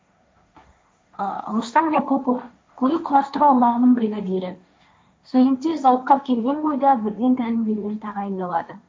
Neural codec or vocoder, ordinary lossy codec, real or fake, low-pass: codec, 16 kHz, 1.1 kbps, Voila-Tokenizer; none; fake; none